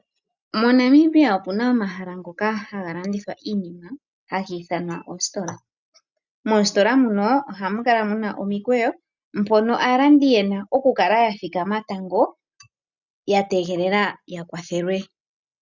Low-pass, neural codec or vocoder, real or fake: 7.2 kHz; none; real